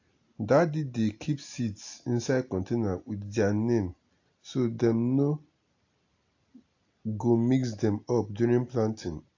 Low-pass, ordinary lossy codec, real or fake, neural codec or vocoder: 7.2 kHz; AAC, 48 kbps; real; none